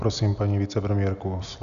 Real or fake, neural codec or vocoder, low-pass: real; none; 7.2 kHz